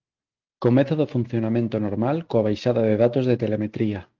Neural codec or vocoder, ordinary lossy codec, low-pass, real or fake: none; Opus, 24 kbps; 7.2 kHz; real